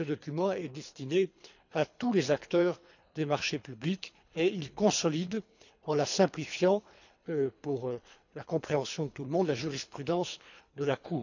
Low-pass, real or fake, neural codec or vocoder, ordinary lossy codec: 7.2 kHz; fake; codec, 24 kHz, 3 kbps, HILCodec; none